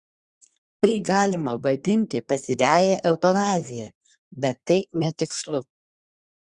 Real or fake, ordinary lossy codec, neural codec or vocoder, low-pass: fake; Opus, 64 kbps; codec, 24 kHz, 1 kbps, SNAC; 10.8 kHz